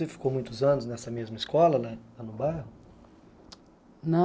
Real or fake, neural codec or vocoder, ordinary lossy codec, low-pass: real; none; none; none